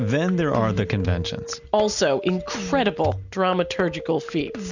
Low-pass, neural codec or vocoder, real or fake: 7.2 kHz; none; real